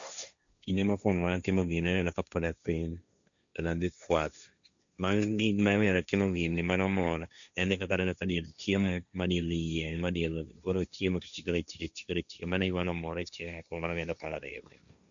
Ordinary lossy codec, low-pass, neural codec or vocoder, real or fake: none; 7.2 kHz; codec, 16 kHz, 1.1 kbps, Voila-Tokenizer; fake